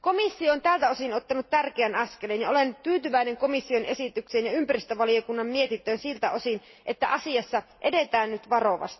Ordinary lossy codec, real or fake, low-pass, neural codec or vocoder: MP3, 24 kbps; real; 7.2 kHz; none